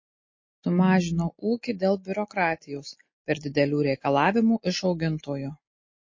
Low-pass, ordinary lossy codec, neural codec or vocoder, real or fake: 7.2 kHz; MP3, 32 kbps; none; real